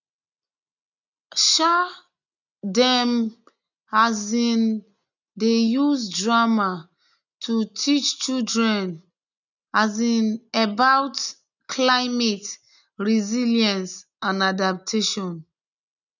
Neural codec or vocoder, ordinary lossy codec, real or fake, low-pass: none; none; real; 7.2 kHz